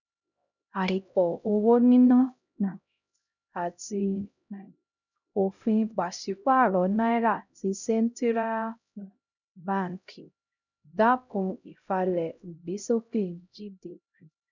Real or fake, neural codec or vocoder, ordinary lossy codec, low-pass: fake; codec, 16 kHz, 0.5 kbps, X-Codec, HuBERT features, trained on LibriSpeech; none; 7.2 kHz